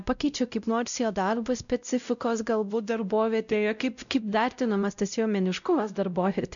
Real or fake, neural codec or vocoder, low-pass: fake; codec, 16 kHz, 0.5 kbps, X-Codec, WavLM features, trained on Multilingual LibriSpeech; 7.2 kHz